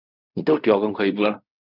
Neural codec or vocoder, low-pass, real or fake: codec, 16 kHz in and 24 kHz out, 0.4 kbps, LongCat-Audio-Codec, fine tuned four codebook decoder; 5.4 kHz; fake